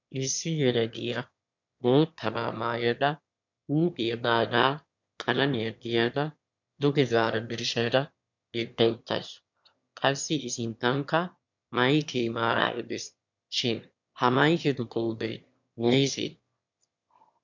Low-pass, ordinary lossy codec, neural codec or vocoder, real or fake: 7.2 kHz; MP3, 64 kbps; autoencoder, 22.05 kHz, a latent of 192 numbers a frame, VITS, trained on one speaker; fake